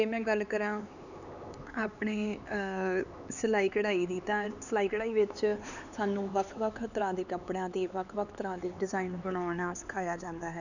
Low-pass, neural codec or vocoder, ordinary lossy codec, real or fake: 7.2 kHz; codec, 16 kHz, 4 kbps, X-Codec, HuBERT features, trained on LibriSpeech; none; fake